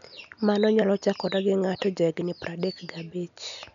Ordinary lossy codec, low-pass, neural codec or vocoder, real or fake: none; 7.2 kHz; none; real